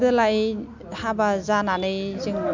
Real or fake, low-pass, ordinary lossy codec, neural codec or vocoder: real; 7.2 kHz; MP3, 64 kbps; none